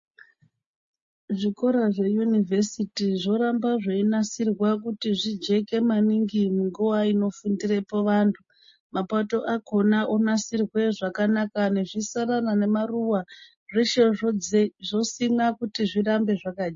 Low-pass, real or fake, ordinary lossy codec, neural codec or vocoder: 7.2 kHz; real; MP3, 32 kbps; none